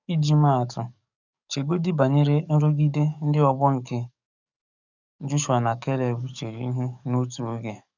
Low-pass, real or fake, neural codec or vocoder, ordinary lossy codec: 7.2 kHz; fake; codec, 44.1 kHz, 7.8 kbps, DAC; none